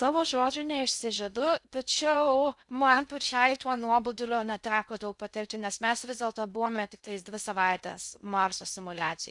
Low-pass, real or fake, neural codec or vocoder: 10.8 kHz; fake; codec, 16 kHz in and 24 kHz out, 0.6 kbps, FocalCodec, streaming, 2048 codes